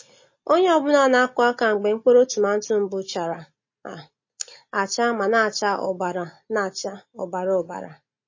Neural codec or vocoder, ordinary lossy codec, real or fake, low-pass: none; MP3, 32 kbps; real; 7.2 kHz